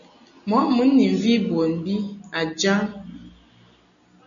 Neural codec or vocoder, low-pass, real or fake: none; 7.2 kHz; real